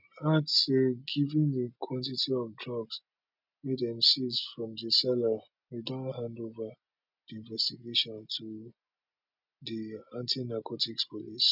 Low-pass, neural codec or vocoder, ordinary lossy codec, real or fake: 5.4 kHz; none; none; real